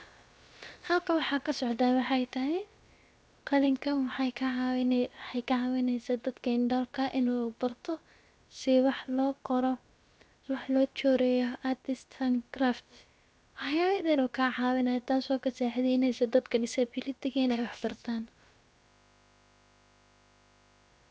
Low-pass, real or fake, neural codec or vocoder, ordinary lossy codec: none; fake; codec, 16 kHz, about 1 kbps, DyCAST, with the encoder's durations; none